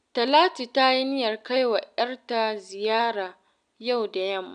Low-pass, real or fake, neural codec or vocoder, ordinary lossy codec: 9.9 kHz; real; none; none